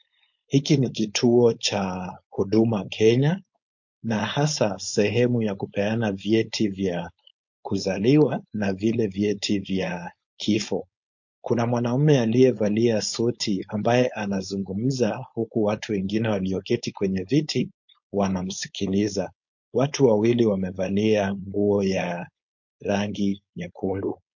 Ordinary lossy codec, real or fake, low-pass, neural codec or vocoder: MP3, 48 kbps; fake; 7.2 kHz; codec, 16 kHz, 4.8 kbps, FACodec